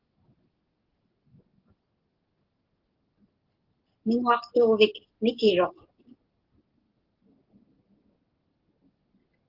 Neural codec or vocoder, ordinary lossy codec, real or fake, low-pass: none; Opus, 16 kbps; real; 5.4 kHz